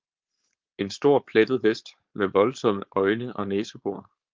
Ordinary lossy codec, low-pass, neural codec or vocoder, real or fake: Opus, 32 kbps; 7.2 kHz; codec, 16 kHz, 4.8 kbps, FACodec; fake